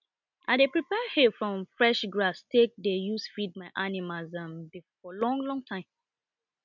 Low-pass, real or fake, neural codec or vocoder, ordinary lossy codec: 7.2 kHz; real; none; none